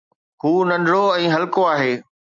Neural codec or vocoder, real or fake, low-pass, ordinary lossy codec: none; real; 7.2 kHz; MP3, 96 kbps